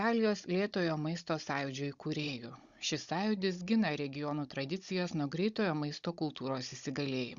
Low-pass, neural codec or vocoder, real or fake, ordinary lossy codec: 7.2 kHz; codec, 16 kHz, 16 kbps, FunCodec, trained on Chinese and English, 50 frames a second; fake; Opus, 64 kbps